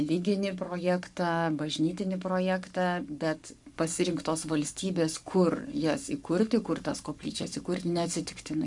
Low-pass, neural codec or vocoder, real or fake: 10.8 kHz; codec, 44.1 kHz, 7.8 kbps, Pupu-Codec; fake